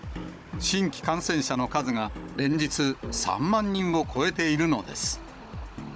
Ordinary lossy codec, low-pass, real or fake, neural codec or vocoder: none; none; fake; codec, 16 kHz, 16 kbps, FunCodec, trained on Chinese and English, 50 frames a second